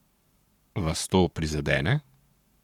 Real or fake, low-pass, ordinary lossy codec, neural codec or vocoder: fake; 19.8 kHz; none; codec, 44.1 kHz, 7.8 kbps, Pupu-Codec